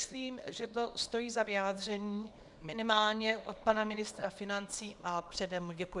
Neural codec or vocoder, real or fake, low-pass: codec, 24 kHz, 0.9 kbps, WavTokenizer, small release; fake; 10.8 kHz